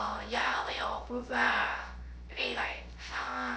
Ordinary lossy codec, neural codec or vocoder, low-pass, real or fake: none; codec, 16 kHz, 0.3 kbps, FocalCodec; none; fake